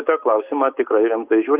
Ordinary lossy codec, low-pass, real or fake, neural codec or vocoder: Opus, 24 kbps; 3.6 kHz; real; none